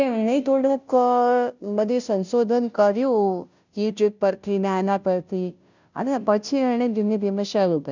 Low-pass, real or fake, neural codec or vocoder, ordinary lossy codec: 7.2 kHz; fake; codec, 16 kHz, 0.5 kbps, FunCodec, trained on Chinese and English, 25 frames a second; none